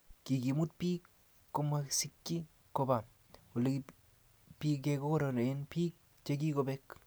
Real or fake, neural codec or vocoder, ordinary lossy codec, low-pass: real; none; none; none